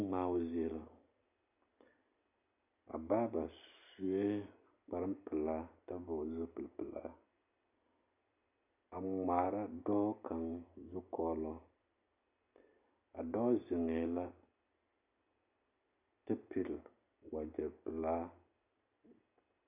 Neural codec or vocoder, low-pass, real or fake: none; 3.6 kHz; real